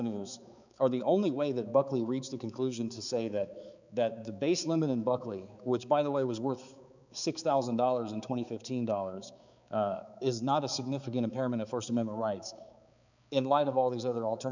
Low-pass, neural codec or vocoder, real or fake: 7.2 kHz; codec, 16 kHz, 4 kbps, X-Codec, HuBERT features, trained on balanced general audio; fake